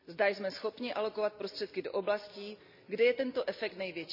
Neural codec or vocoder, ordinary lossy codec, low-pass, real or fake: none; none; 5.4 kHz; real